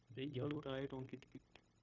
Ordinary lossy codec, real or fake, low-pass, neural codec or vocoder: none; fake; 7.2 kHz; codec, 16 kHz, 0.9 kbps, LongCat-Audio-Codec